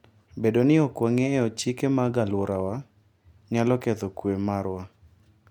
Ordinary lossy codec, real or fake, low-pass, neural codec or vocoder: MP3, 96 kbps; real; 19.8 kHz; none